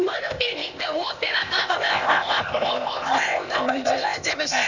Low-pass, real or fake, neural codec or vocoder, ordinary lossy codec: 7.2 kHz; fake; codec, 16 kHz, 0.8 kbps, ZipCodec; none